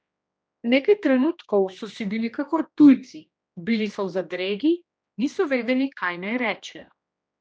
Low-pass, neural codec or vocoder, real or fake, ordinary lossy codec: none; codec, 16 kHz, 1 kbps, X-Codec, HuBERT features, trained on general audio; fake; none